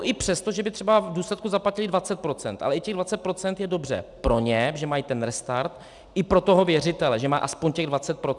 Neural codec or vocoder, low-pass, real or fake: none; 10.8 kHz; real